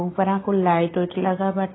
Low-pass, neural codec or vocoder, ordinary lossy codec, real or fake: 7.2 kHz; none; AAC, 16 kbps; real